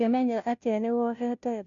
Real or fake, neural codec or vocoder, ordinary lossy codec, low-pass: fake; codec, 16 kHz, 0.5 kbps, FunCodec, trained on Chinese and English, 25 frames a second; none; 7.2 kHz